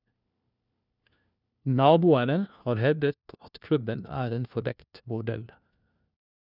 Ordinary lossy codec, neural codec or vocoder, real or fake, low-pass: none; codec, 16 kHz, 1 kbps, FunCodec, trained on LibriTTS, 50 frames a second; fake; 5.4 kHz